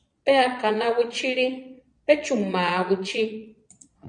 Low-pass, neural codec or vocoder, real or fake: 9.9 kHz; vocoder, 22.05 kHz, 80 mel bands, Vocos; fake